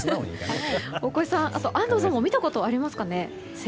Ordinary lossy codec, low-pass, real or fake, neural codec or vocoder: none; none; real; none